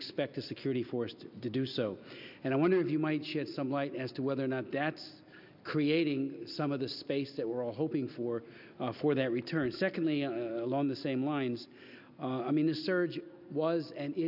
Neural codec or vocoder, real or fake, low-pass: none; real; 5.4 kHz